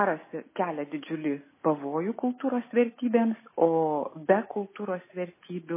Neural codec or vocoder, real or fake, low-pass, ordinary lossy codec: none; real; 3.6 kHz; MP3, 16 kbps